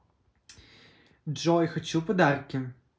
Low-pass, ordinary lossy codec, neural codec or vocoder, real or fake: none; none; none; real